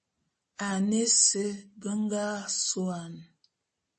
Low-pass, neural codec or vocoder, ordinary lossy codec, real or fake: 10.8 kHz; none; MP3, 32 kbps; real